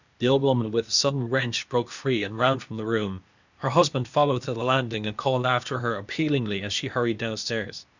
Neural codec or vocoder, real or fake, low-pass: codec, 16 kHz, 0.8 kbps, ZipCodec; fake; 7.2 kHz